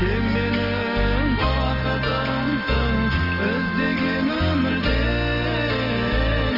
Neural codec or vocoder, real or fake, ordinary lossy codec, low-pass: none; real; Opus, 24 kbps; 5.4 kHz